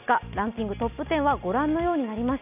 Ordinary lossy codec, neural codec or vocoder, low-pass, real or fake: none; none; 3.6 kHz; real